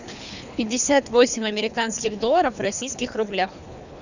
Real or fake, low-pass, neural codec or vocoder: fake; 7.2 kHz; codec, 24 kHz, 3 kbps, HILCodec